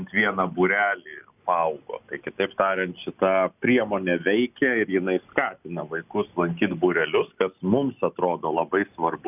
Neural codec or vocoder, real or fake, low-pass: none; real; 3.6 kHz